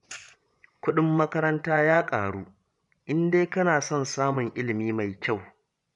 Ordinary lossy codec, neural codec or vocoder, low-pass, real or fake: none; vocoder, 24 kHz, 100 mel bands, Vocos; 10.8 kHz; fake